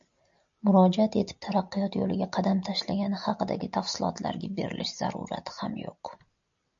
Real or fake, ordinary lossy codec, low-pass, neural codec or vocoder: real; AAC, 64 kbps; 7.2 kHz; none